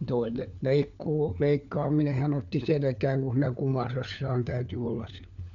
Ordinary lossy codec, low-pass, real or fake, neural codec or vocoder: none; 7.2 kHz; fake; codec, 16 kHz, 4 kbps, FunCodec, trained on LibriTTS, 50 frames a second